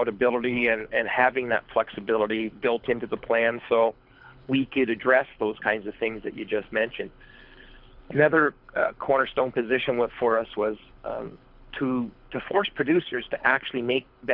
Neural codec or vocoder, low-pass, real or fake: codec, 24 kHz, 6 kbps, HILCodec; 5.4 kHz; fake